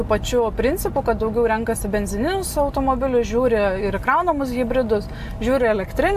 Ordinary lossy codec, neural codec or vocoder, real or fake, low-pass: AAC, 96 kbps; none; real; 14.4 kHz